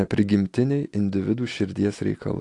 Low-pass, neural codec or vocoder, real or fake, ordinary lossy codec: 10.8 kHz; none; real; AAC, 48 kbps